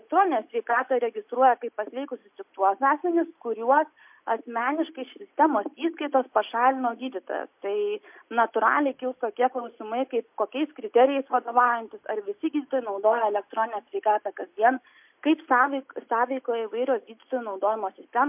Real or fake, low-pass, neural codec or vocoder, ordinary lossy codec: real; 3.6 kHz; none; MP3, 32 kbps